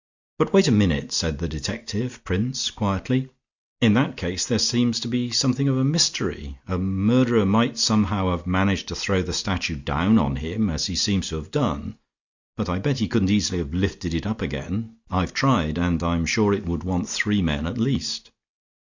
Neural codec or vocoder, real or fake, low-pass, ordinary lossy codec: none; real; 7.2 kHz; Opus, 64 kbps